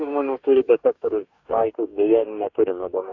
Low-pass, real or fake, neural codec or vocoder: 7.2 kHz; fake; codec, 44.1 kHz, 2.6 kbps, DAC